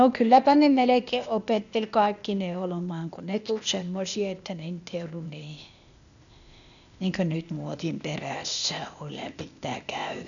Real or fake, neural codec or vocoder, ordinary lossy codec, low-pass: fake; codec, 16 kHz, 0.8 kbps, ZipCodec; none; 7.2 kHz